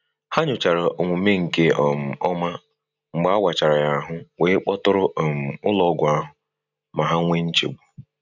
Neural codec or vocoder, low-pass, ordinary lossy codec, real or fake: none; 7.2 kHz; none; real